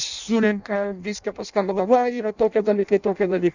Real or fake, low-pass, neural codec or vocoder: fake; 7.2 kHz; codec, 16 kHz in and 24 kHz out, 0.6 kbps, FireRedTTS-2 codec